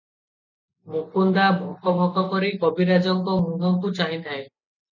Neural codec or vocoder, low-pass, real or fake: none; 7.2 kHz; real